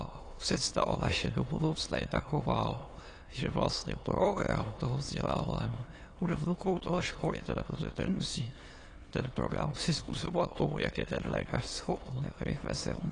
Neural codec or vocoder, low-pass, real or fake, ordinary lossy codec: autoencoder, 22.05 kHz, a latent of 192 numbers a frame, VITS, trained on many speakers; 9.9 kHz; fake; AAC, 32 kbps